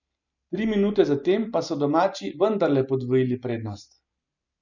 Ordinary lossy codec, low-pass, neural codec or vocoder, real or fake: none; 7.2 kHz; none; real